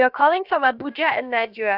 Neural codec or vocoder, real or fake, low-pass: codec, 16 kHz, about 1 kbps, DyCAST, with the encoder's durations; fake; 5.4 kHz